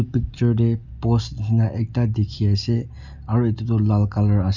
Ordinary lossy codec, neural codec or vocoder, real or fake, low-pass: none; none; real; 7.2 kHz